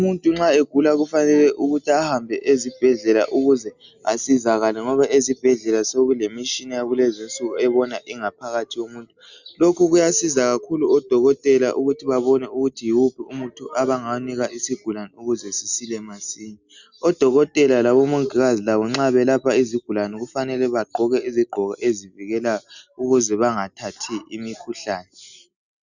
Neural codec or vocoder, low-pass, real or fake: none; 7.2 kHz; real